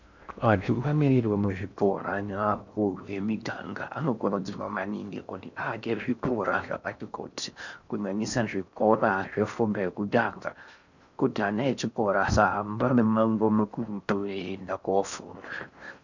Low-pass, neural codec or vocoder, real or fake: 7.2 kHz; codec, 16 kHz in and 24 kHz out, 0.6 kbps, FocalCodec, streaming, 2048 codes; fake